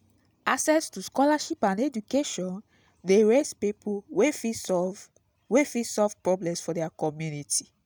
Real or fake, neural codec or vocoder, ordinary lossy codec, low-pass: real; none; none; none